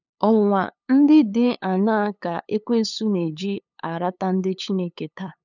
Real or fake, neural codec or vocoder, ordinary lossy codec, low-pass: fake; codec, 16 kHz, 8 kbps, FunCodec, trained on LibriTTS, 25 frames a second; none; 7.2 kHz